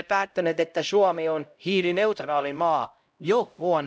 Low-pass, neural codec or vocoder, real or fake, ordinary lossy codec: none; codec, 16 kHz, 0.5 kbps, X-Codec, HuBERT features, trained on LibriSpeech; fake; none